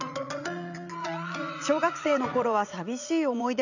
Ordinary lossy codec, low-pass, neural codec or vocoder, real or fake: none; 7.2 kHz; vocoder, 44.1 kHz, 80 mel bands, Vocos; fake